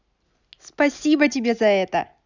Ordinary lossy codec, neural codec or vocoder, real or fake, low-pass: none; none; real; 7.2 kHz